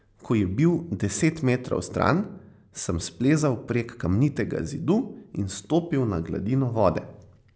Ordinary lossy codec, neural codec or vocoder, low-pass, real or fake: none; none; none; real